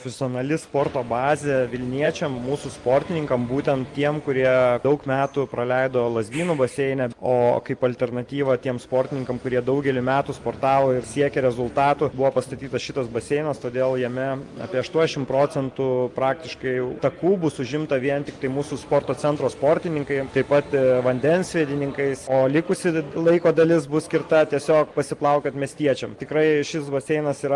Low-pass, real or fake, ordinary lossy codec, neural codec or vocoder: 10.8 kHz; real; Opus, 24 kbps; none